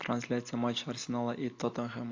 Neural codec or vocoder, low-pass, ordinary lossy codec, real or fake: none; 7.2 kHz; AAC, 48 kbps; real